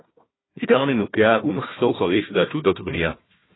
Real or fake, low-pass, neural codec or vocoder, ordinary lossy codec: fake; 7.2 kHz; codec, 16 kHz, 1 kbps, FunCodec, trained on Chinese and English, 50 frames a second; AAC, 16 kbps